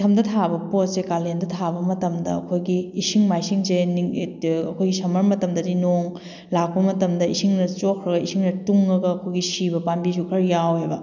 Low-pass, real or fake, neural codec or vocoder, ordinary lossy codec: 7.2 kHz; real; none; none